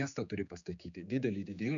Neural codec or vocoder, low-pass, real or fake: codec, 16 kHz, 4 kbps, X-Codec, HuBERT features, trained on general audio; 7.2 kHz; fake